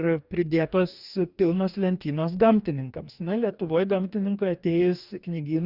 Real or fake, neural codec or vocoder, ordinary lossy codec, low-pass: fake; codec, 44.1 kHz, 2.6 kbps, DAC; Opus, 64 kbps; 5.4 kHz